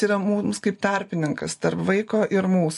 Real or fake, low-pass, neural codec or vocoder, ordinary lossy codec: real; 14.4 kHz; none; MP3, 48 kbps